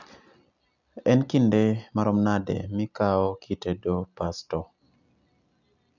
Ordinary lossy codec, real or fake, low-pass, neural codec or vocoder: none; real; 7.2 kHz; none